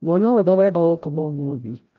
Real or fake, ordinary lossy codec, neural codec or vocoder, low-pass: fake; Opus, 24 kbps; codec, 16 kHz, 0.5 kbps, FreqCodec, larger model; 7.2 kHz